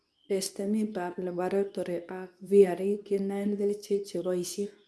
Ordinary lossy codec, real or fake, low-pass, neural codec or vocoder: none; fake; none; codec, 24 kHz, 0.9 kbps, WavTokenizer, medium speech release version 2